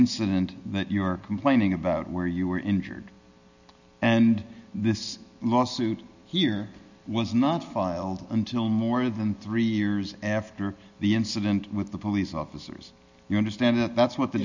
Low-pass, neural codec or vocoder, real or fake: 7.2 kHz; none; real